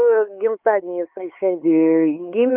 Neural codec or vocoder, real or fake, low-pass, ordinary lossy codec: codec, 16 kHz, 4 kbps, X-Codec, HuBERT features, trained on LibriSpeech; fake; 3.6 kHz; Opus, 24 kbps